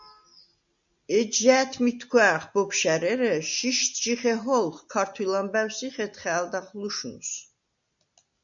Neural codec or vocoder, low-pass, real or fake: none; 7.2 kHz; real